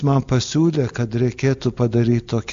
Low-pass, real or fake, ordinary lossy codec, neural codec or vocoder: 7.2 kHz; real; AAC, 64 kbps; none